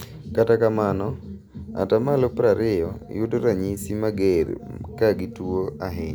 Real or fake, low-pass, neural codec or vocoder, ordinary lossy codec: real; none; none; none